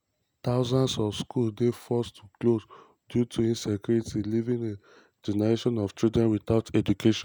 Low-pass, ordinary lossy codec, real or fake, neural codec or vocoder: none; none; real; none